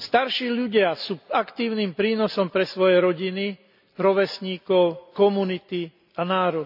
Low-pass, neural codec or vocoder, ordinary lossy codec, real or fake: 5.4 kHz; none; none; real